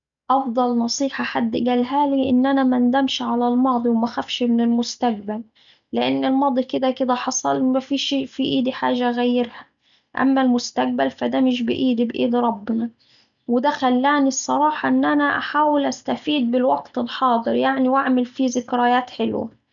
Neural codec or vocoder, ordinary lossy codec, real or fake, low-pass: none; none; real; 7.2 kHz